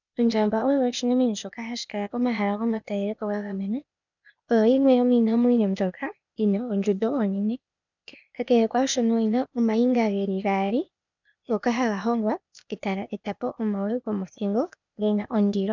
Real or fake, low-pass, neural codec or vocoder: fake; 7.2 kHz; codec, 16 kHz, 0.8 kbps, ZipCodec